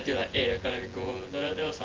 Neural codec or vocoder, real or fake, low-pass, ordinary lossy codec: vocoder, 24 kHz, 100 mel bands, Vocos; fake; 7.2 kHz; Opus, 16 kbps